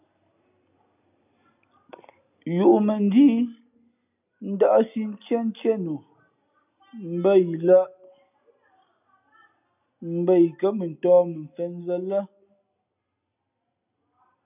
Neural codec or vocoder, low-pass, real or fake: none; 3.6 kHz; real